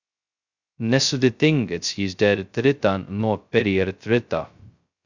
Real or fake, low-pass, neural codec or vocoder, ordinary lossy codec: fake; 7.2 kHz; codec, 16 kHz, 0.2 kbps, FocalCodec; Opus, 64 kbps